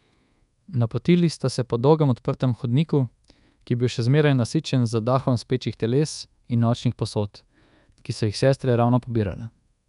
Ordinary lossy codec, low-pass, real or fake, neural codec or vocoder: none; 10.8 kHz; fake; codec, 24 kHz, 1.2 kbps, DualCodec